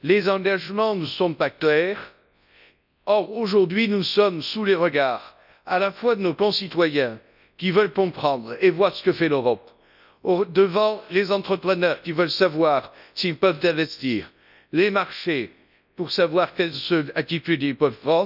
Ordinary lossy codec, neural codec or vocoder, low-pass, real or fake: none; codec, 24 kHz, 0.9 kbps, WavTokenizer, large speech release; 5.4 kHz; fake